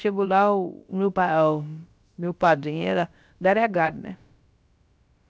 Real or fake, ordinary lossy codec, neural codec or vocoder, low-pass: fake; none; codec, 16 kHz, about 1 kbps, DyCAST, with the encoder's durations; none